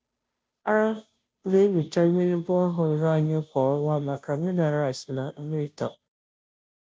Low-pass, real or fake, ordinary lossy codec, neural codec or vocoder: none; fake; none; codec, 16 kHz, 0.5 kbps, FunCodec, trained on Chinese and English, 25 frames a second